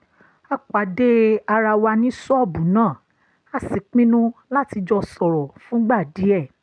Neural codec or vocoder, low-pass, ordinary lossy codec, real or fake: none; 9.9 kHz; none; real